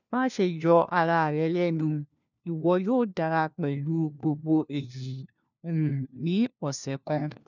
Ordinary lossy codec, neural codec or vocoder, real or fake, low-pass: none; codec, 16 kHz, 1 kbps, FunCodec, trained on LibriTTS, 50 frames a second; fake; 7.2 kHz